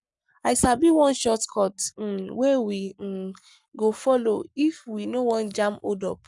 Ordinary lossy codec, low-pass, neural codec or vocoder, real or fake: none; 10.8 kHz; codec, 44.1 kHz, 7.8 kbps, Pupu-Codec; fake